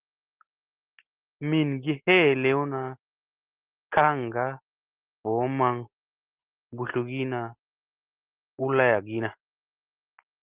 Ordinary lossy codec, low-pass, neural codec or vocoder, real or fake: Opus, 16 kbps; 3.6 kHz; none; real